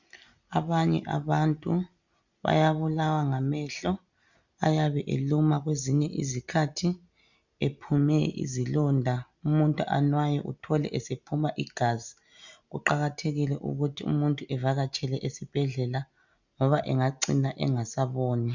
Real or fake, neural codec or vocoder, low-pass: real; none; 7.2 kHz